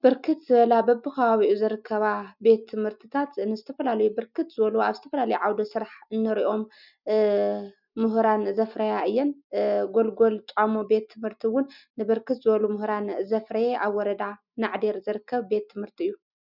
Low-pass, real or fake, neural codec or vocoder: 5.4 kHz; real; none